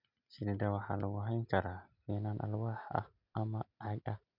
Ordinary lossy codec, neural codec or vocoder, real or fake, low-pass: none; none; real; 5.4 kHz